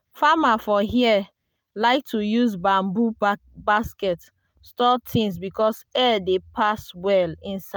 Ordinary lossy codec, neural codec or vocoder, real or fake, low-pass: none; none; real; none